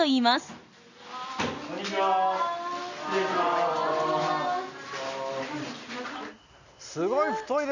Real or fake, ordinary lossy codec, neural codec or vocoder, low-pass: real; none; none; 7.2 kHz